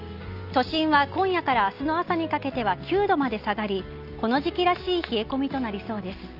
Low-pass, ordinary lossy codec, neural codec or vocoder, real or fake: 5.4 kHz; Opus, 24 kbps; none; real